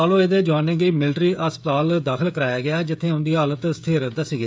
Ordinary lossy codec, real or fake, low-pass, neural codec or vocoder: none; fake; none; codec, 16 kHz, 16 kbps, FreqCodec, smaller model